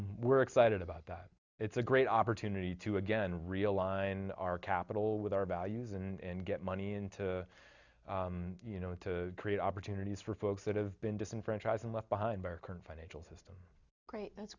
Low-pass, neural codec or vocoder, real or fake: 7.2 kHz; none; real